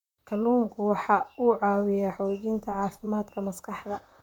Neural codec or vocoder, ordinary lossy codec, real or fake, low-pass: vocoder, 44.1 kHz, 128 mel bands, Pupu-Vocoder; none; fake; 19.8 kHz